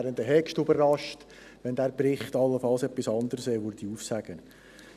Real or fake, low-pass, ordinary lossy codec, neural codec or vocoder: real; 14.4 kHz; none; none